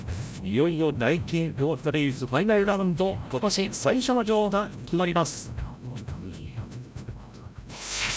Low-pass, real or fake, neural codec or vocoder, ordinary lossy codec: none; fake; codec, 16 kHz, 0.5 kbps, FreqCodec, larger model; none